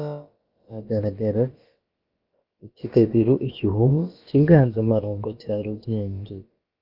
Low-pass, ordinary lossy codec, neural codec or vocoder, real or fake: 5.4 kHz; Opus, 24 kbps; codec, 16 kHz, about 1 kbps, DyCAST, with the encoder's durations; fake